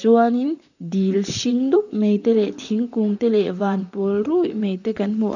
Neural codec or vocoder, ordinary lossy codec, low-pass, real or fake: vocoder, 44.1 kHz, 128 mel bands, Pupu-Vocoder; none; 7.2 kHz; fake